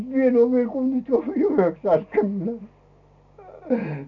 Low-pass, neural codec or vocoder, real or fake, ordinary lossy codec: 7.2 kHz; none; real; none